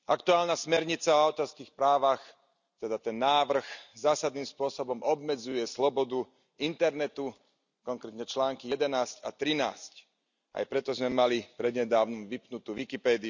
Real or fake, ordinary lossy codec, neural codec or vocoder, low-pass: real; none; none; 7.2 kHz